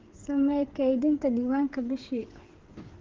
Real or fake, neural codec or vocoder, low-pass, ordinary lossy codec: fake; codec, 16 kHz, 8 kbps, FreqCodec, smaller model; 7.2 kHz; Opus, 16 kbps